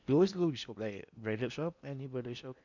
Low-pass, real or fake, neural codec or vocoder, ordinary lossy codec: 7.2 kHz; fake; codec, 16 kHz in and 24 kHz out, 0.8 kbps, FocalCodec, streaming, 65536 codes; none